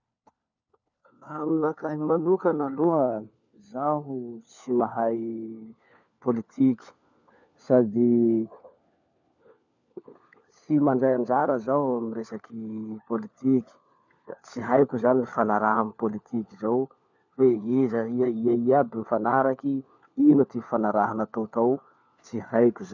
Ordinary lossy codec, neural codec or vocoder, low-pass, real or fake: none; codec, 16 kHz, 4 kbps, FunCodec, trained on LibriTTS, 50 frames a second; 7.2 kHz; fake